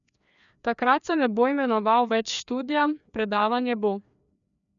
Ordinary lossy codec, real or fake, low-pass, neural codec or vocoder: none; fake; 7.2 kHz; codec, 16 kHz, 2 kbps, FreqCodec, larger model